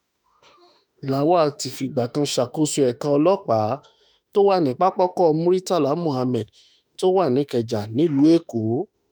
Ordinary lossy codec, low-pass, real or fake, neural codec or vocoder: none; none; fake; autoencoder, 48 kHz, 32 numbers a frame, DAC-VAE, trained on Japanese speech